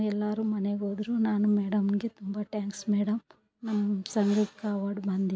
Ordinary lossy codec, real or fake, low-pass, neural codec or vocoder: none; real; none; none